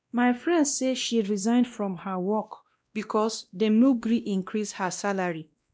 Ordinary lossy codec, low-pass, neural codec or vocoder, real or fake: none; none; codec, 16 kHz, 1 kbps, X-Codec, WavLM features, trained on Multilingual LibriSpeech; fake